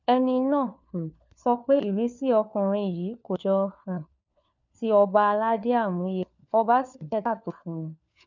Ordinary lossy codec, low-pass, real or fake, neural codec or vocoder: none; 7.2 kHz; fake; codec, 16 kHz, 4 kbps, FunCodec, trained on LibriTTS, 50 frames a second